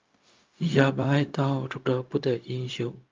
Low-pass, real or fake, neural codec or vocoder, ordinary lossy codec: 7.2 kHz; fake; codec, 16 kHz, 0.4 kbps, LongCat-Audio-Codec; Opus, 24 kbps